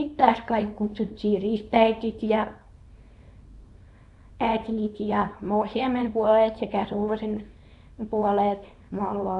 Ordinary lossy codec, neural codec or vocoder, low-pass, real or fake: none; codec, 24 kHz, 0.9 kbps, WavTokenizer, small release; 10.8 kHz; fake